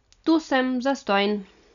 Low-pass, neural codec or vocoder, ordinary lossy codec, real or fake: 7.2 kHz; none; none; real